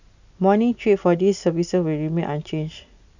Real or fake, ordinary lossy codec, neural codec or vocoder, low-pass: real; none; none; 7.2 kHz